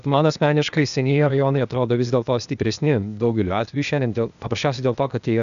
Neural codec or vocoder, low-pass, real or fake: codec, 16 kHz, 0.8 kbps, ZipCodec; 7.2 kHz; fake